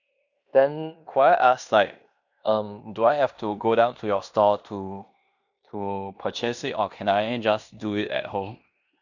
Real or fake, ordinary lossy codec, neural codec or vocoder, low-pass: fake; none; codec, 16 kHz in and 24 kHz out, 0.9 kbps, LongCat-Audio-Codec, fine tuned four codebook decoder; 7.2 kHz